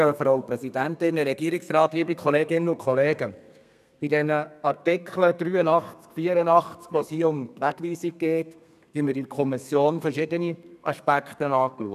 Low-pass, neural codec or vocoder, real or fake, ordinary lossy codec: 14.4 kHz; codec, 32 kHz, 1.9 kbps, SNAC; fake; none